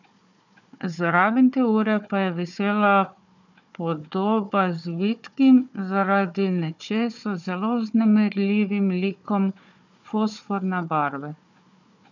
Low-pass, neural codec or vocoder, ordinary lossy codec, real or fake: 7.2 kHz; codec, 16 kHz, 4 kbps, FunCodec, trained on Chinese and English, 50 frames a second; none; fake